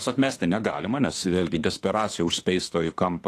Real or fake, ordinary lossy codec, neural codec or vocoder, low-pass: fake; AAC, 48 kbps; autoencoder, 48 kHz, 32 numbers a frame, DAC-VAE, trained on Japanese speech; 14.4 kHz